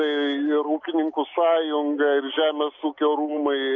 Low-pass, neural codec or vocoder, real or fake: 7.2 kHz; none; real